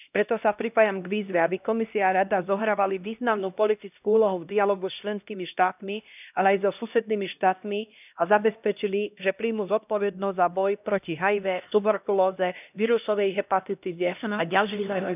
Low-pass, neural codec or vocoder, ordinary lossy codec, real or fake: 3.6 kHz; codec, 16 kHz, 1 kbps, X-Codec, HuBERT features, trained on LibriSpeech; none; fake